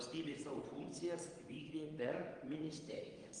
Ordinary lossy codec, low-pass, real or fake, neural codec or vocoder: Opus, 24 kbps; 9.9 kHz; fake; vocoder, 22.05 kHz, 80 mel bands, WaveNeXt